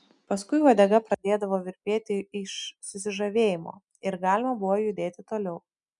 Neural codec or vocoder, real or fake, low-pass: none; real; 10.8 kHz